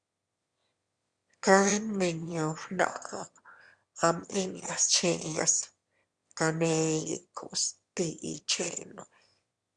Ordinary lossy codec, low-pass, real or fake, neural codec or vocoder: Opus, 64 kbps; 9.9 kHz; fake; autoencoder, 22.05 kHz, a latent of 192 numbers a frame, VITS, trained on one speaker